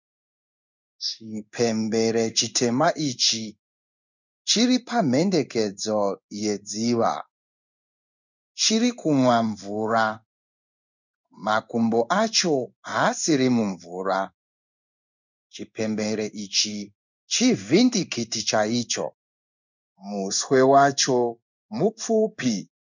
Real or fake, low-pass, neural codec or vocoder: fake; 7.2 kHz; codec, 16 kHz in and 24 kHz out, 1 kbps, XY-Tokenizer